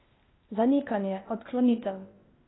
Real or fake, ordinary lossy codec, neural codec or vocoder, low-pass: fake; AAC, 16 kbps; codec, 16 kHz, 0.8 kbps, ZipCodec; 7.2 kHz